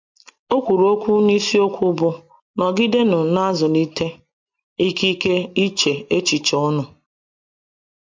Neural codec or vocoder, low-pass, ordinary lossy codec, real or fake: none; 7.2 kHz; MP3, 48 kbps; real